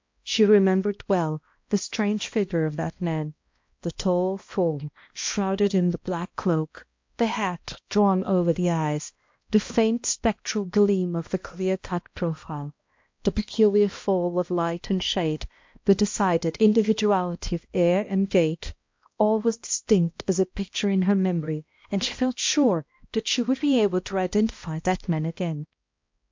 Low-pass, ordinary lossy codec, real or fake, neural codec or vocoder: 7.2 kHz; MP3, 48 kbps; fake; codec, 16 kHz, 1 kbps, X-Codec, HuBERT features, trained on balanced general audio